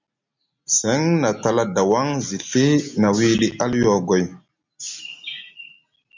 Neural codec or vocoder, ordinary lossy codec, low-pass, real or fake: none; MP3, 64 kbps; 7.2 kHz; real